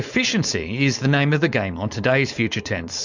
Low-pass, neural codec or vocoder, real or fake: 7.2 kHz; codec, 16 kHz, 4.8 kbps, FACodec; fake